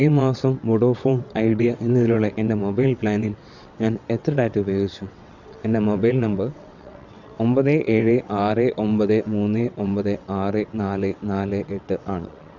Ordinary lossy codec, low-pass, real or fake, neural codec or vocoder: none; 7.2 kHz; fake; vocoder, 22.05 kHz, 80 mel bands, WaveNeXt